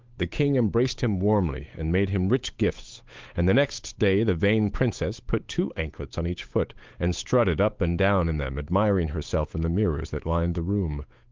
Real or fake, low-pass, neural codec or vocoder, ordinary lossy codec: fake; 7.2 kHz; codec, 16 kHz, 8 kbps, FunCodec, trained on Chinese and English, 25 frames a second; Opus, 32 kbps